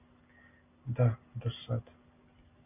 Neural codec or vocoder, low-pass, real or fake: none; 3.6 kHz; real